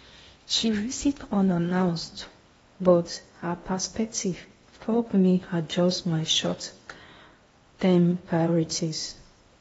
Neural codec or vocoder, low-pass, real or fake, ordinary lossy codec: codec, 16 kHz in and 24 kHz out, 0.8 kbps, FocalCodec, streaming, 65536 codes; 10.8 kHz; fake; AAC, 24 kbps